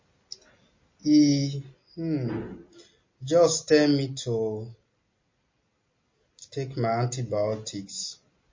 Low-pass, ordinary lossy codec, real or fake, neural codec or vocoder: 7.2 kHz; MP3, 32 kbps; real; none